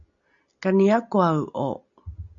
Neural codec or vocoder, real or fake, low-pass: none; real; 7.2 kHz